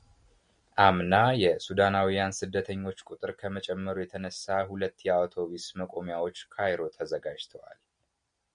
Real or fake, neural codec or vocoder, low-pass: real; none; 9.9 kHz